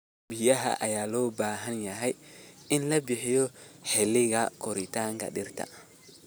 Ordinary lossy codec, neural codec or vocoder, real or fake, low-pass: none; none; real; none